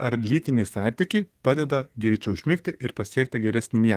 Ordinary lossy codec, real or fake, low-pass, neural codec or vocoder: Opus, 24 kbps; fake; 14.4 kHz; codec, 32 kHz, 1.9 kbps, SNAC